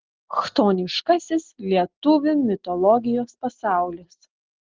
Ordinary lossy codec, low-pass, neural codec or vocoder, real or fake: Opus, 16 kbps; 7.2 kHz; none; real